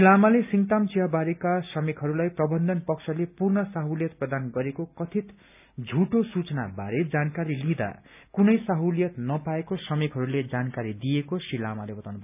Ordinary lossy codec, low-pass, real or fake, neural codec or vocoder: none; 3.6 kHz; real; none